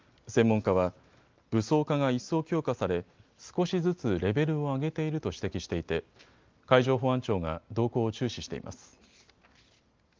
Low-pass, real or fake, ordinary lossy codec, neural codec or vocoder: 7.2 kHz; real; Opus, 32 kbps; none